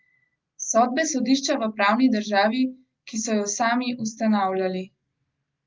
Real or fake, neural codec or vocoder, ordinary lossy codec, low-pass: real; none; Opus, 32 kbps; 7.2 kHz